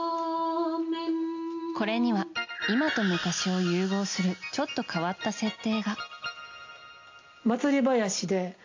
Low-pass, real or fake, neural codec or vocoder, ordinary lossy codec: 7.2 kHz; real; none; AAC, 48 kbps